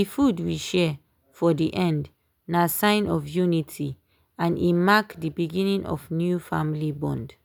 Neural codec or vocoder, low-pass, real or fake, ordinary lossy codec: none; none; real; none